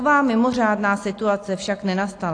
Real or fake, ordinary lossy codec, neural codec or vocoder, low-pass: real; AAC, 48 kbps; none; 9.9 kHz